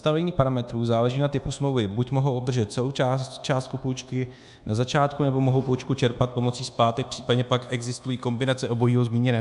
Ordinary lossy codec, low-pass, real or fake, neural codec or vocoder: AAC, 96 kbps; 10.8 kHz; fake; codec, 24 kHz, 1.2 kbps, DualCodec